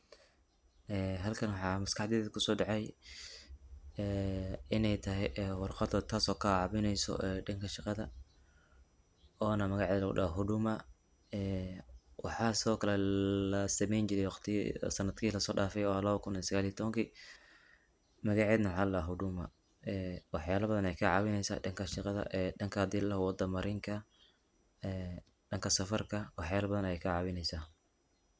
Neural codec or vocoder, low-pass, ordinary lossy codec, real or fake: none; none; none; real